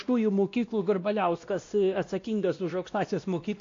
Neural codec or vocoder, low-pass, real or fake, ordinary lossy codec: codec, 16 kHz, 1 kbps, X-Codec, WavLM features, trained on Multilingual LibriSpeech; 7.2 kHz; fake; AAC, 48 kbps